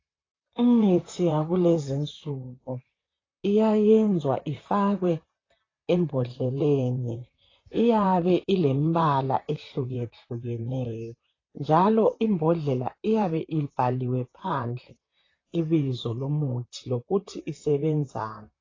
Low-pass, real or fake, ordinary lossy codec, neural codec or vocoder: 7.2 kHz; fake; AAC, 32 kbps; vocoder, 44.1 kHz, 128 mel bands, Pupu-Vocoder